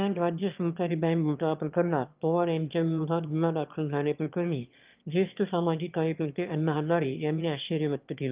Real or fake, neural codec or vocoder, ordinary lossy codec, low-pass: fake; autoencoder, 22.05 kHz, a latent of 192 numbers a frame, VITS, trained on one speaker; Opus, 24 kbps; 3.6 kHz